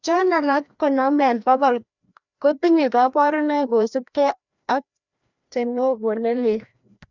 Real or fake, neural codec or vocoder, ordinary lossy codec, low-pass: fake; codec, 16 kHz, 1 kbps, FreqCodec, larger model; none; 7.2 kHz